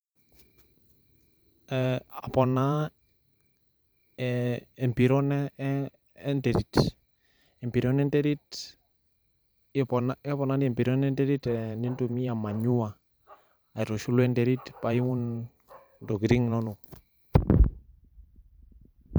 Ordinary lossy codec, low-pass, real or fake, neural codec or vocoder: none; none; fake; vocoder, 44.1 kHz, 128 mel bands every 512 samples, BigVGAN v2